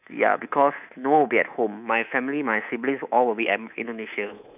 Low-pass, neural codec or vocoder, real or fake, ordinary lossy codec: 3.6 kHz; codec, 24 kHz, 3.1 kbps, DualCodec; fake; none